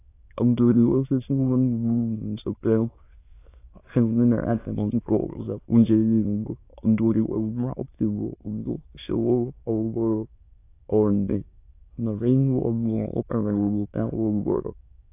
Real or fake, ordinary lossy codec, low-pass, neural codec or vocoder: fake; AAC, 24 kbps; 3.6 kHz; autoencoder, 22.05 kHz, a latent of 192 numbers a frame, VITS, trained on many speakers